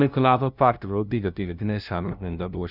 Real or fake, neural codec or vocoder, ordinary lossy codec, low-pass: fake; codec, 16 kHz, 0.5 kbps, FunCodec, trained on LibriTTS, 25 frames a second; none; 5.4 kHz